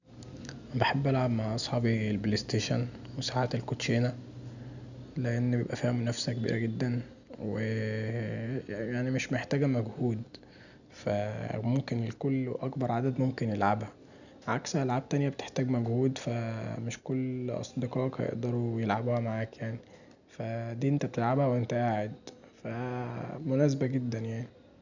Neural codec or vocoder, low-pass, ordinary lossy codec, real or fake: none; 7.2 kHz; none; real